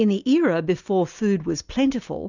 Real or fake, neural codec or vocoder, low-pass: real; none; 7.2 kHz